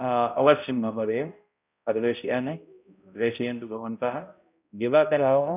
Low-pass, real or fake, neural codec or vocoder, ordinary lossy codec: 3.6 kHz; fake; codec, 16 kHz, 0.5 kbps, X-Codec, HuBERT features, trained on balanced general audio; none